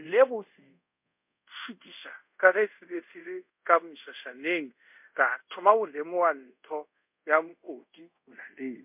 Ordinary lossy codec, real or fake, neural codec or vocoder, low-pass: MP3, 32 kbps; fake; codec, 24 kHz, 0.5 kbps, DualCodec; 3.6 kHz